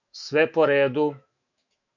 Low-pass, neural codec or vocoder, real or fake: 7.2 kHz; autoencoder, 48 kHz, 128 numbers a frame, DAC-VAE, trained on Japanese speech; fake